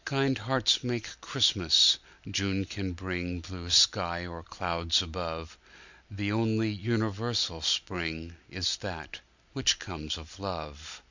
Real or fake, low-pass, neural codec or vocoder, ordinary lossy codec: real; 7.2 kHz; none; Opus, 64 kbps